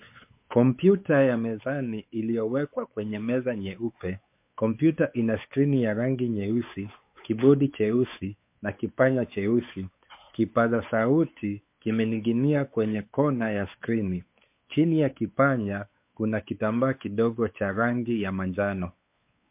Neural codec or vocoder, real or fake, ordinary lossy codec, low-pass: codec, 16 kHz, 4 kbps, X-Codec, WavLM features, trained on Multilingual LibriSpeech; fake; MP3, 32 kbps; 3.6 kHz